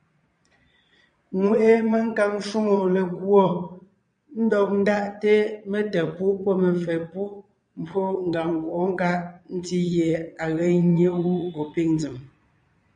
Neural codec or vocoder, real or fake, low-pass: vocoder, 22.05 kHz, 80 mel bands, Vocos; fake; 9.9 kHz